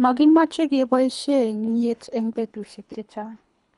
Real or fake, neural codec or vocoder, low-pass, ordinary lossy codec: fake; codec, 24 kHz, 3 kbps, HILCodec; 10.8 kHz; none